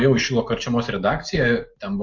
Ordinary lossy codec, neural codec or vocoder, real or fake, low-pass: MP3, 48 kbps; none; real; 7.2 kHz